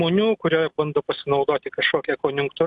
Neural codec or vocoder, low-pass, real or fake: none; 10.8 kHz; real